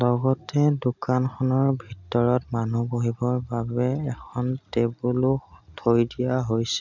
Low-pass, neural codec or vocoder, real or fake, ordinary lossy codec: 7.2 kHz; none; real; none